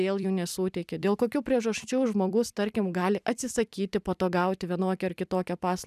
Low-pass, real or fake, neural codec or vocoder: 14.4 kHz; fake; vocoder, 44.1 kHz, 128 mel bands every 256 samples, BigVGAN v2